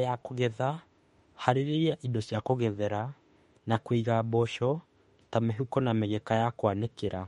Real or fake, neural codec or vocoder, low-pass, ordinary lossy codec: fake; autoencoder, 48 kHz, 32 numbers a frame, DAC-VAE, trained on Japanese speech; 19.8 kHz; MP3, 48 kbps